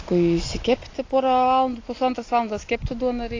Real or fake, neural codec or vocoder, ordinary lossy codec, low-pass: real; none; AAC, 32 kbps; 7.2 kHz